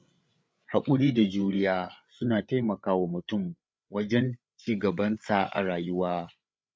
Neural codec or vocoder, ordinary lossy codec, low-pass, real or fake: codec, 16 kHz, 8 kbps, FreqCodec, larger model; none; none; fake